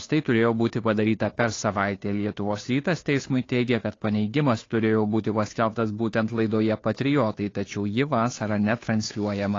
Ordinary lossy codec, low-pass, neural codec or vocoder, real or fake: AAC, 32 kbps; 7.2 kHz; codec, 16 kHz, 2 kbps, FunCodec, trained on Chinese and English, 25 frames a second; fake